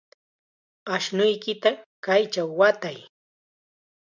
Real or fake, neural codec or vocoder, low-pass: real; none; 7.2 kHz